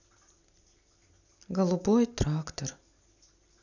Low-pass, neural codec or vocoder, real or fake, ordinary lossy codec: 7.2 kHz; none; real; none